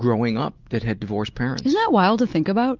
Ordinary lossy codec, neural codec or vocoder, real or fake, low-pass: Opus, 24 kbps; none; real; 7.2 kHz